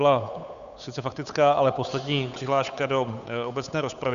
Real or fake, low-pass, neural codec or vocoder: fake; 7.2 kHz; codec, 16 kHz, 8 kbps, FunCodec, trained on Chinese and English, 25 frames a second